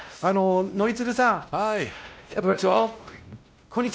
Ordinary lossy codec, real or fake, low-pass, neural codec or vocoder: none; fake; none; codec, 16 kHz, 1 kbps, X-Codec, WavLM features, trained on Multilingual LibriSpeech